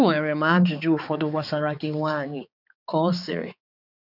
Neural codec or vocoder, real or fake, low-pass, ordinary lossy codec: codec, 16 kHz, 4 kbps, X-Codec, HuBERT features, trained on balanced general audio; fake; 5.4 kHz; none